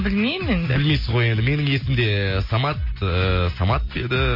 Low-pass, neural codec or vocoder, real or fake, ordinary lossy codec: 5.4 kHz; none; real; MP3, 24 kbps